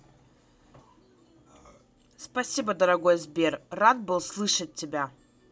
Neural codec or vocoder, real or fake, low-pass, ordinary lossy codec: none; real; none; none